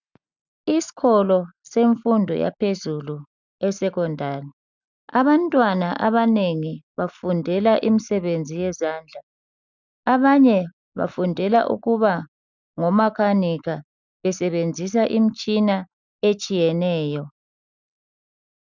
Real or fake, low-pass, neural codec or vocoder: real; 7.2 kHz; none